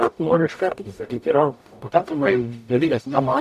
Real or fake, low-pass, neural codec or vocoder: fake; 14.4 kHz; codec, 44.1 kHz, 0.9 kbps, DAC